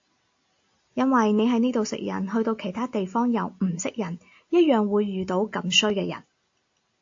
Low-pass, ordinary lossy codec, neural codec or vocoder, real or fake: 7.2 kHz; AAC, 48 kbps; none; real